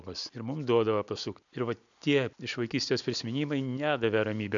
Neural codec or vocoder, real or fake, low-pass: none; real; 7.2 kHz